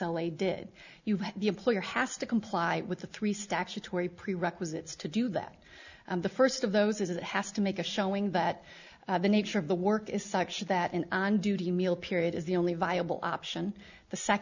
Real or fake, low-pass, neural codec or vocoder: real; 7.2 kHz; none